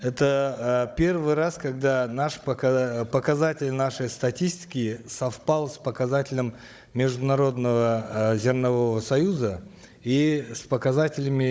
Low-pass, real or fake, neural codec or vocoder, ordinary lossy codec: none; fake; codec, 16 kHz, 16 kbps, FunCodec, trained on Chinese and English, 50 frames a second; none